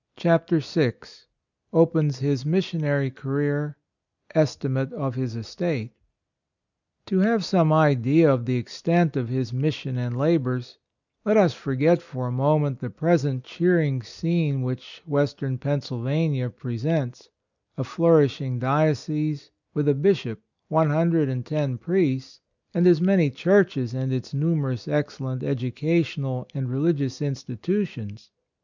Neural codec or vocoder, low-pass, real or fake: none; 7.2 kHz; real